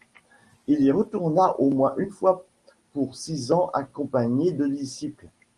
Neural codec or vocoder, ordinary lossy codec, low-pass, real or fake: none; Opus, 24 kbps; 10.8 kHz; real